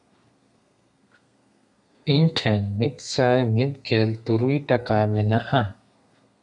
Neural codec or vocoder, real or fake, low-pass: codec, 32 kHz, 1.9 kbps, SNAC; fake; 10.8 kHz